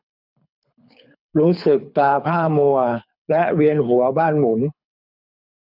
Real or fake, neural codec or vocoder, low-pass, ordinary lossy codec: fake; codec, 24 kHz, 6 kbps, HILCodec; 5.4 kHz; none